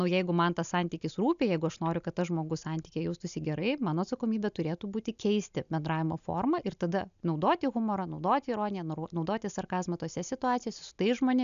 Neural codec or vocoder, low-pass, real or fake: none; 7.2 kHz; real